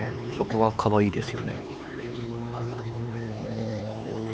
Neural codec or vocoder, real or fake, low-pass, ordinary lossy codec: codec, 16 kHz, 4 kbps, X-Codec, HuBERT features, trained on LibriSpeech; fake; none; none